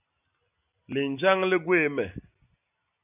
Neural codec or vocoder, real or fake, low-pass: none; real; 3.6 kHz